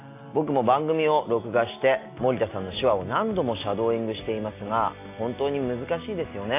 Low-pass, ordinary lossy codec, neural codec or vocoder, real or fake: 3.6 kHz; AAC, 24 kbps; none; real